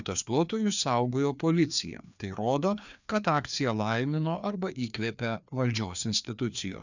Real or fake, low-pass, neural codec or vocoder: fake; 7.2 kHz; codec, 16 kHz, 2 kbps, FreqCodec, larger model